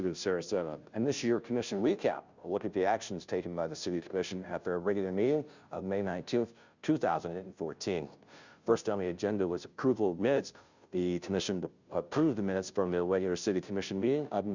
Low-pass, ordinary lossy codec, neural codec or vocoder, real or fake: 7.2 kHz; Opus, 64 kbps; codec, 16 kHz, 0.5 kbps, FunCodec, trained on Chinese and English, 25 frames a second; fake